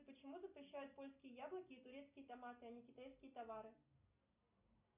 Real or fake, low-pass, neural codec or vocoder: real; 3.6 kHz; none